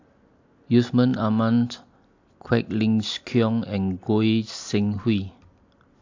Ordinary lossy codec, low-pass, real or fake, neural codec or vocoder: AAC, 48 kbps; 7.2 kHz; real; none